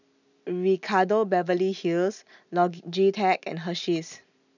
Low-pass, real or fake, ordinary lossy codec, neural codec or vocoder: 7.2 kHz; real; none; none